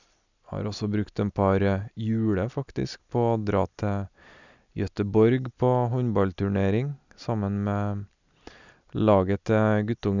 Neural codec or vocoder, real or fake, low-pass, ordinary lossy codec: none; real; 7.2 kHz; none